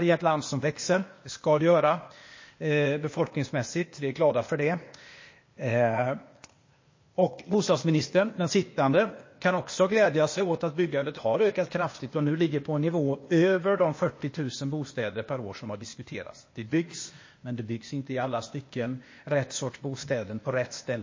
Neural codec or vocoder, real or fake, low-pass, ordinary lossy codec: codec, 16 kHz, 0.8 kbps, ZipCodec; fake; 7.2 kHz; MP3, 32 kbps